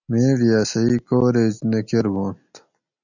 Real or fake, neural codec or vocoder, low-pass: real; none; 7.2 kHz